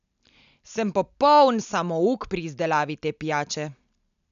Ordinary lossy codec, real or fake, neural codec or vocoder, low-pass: none; real; none; 7.2 kHz